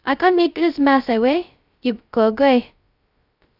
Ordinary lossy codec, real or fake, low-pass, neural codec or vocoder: Opus, 64 kbps; fake; 5.4 kHz; codec, 16 kHz, 0.2 kbps, FocalCodec